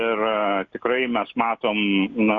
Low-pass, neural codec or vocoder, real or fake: 7.2 kHz; none; real